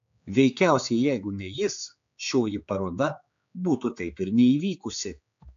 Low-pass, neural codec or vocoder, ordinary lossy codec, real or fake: 7.2 kHz; codec, 16 kHz, 4 kbps, X-Codec, HuBERT features, trained on general audio; AAC, 64 kbps; fake